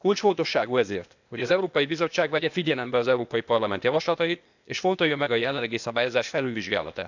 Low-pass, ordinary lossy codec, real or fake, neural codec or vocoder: 7.2 kHz; none; fake; codec, 16 kHz, 0.8 kbps, ZipCodec